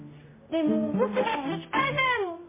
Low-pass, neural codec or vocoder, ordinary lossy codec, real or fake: 3.6 kHz; codec, 16 kHz, 0.5 kbps, X-Codec, HuBERT features, trained on general audio; MP3, 16 kbps; fake